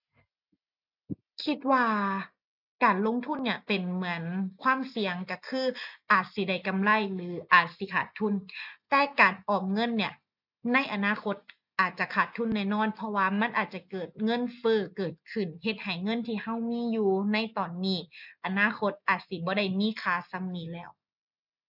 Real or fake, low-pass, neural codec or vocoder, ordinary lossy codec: real; 5.4 kHz; none; none